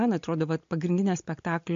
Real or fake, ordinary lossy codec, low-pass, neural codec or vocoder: real; MP3, 48 kbps; 7.2 kHz; none